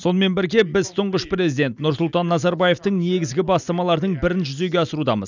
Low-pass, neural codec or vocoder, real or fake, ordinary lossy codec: 7.2 kHz; none; real; none